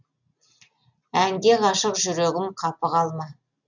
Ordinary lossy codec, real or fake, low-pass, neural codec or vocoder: none; real; 7.2 kHz; none